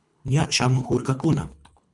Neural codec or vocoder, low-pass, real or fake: codec, 24 kHz, 3 kbps, HILCodec; 10.8 kHz; fake